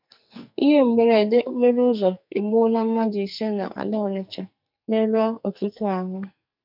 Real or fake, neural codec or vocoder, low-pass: fake; codec, 44.1 kHz, 2.6 kbps, SNAC; 5.4 kHz